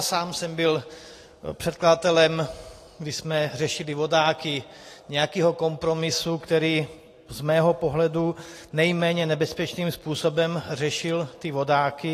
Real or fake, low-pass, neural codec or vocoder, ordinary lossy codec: real; 14.4 kHz; none; AAC, 48 kbps